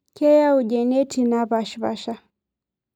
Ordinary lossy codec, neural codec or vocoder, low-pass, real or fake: none; none; 19.8 kHz; real